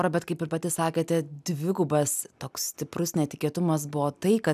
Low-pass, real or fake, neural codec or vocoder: 14.4 kHz; real; none